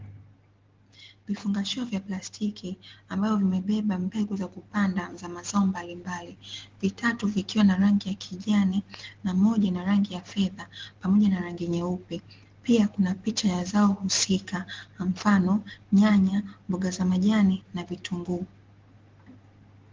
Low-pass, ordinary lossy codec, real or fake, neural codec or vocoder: 7.2 kHz; Opus, 16 kbps; real; none